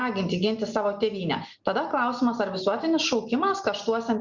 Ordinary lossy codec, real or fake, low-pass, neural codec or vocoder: Opus, 64 kbps; real; 7.2 kHz; none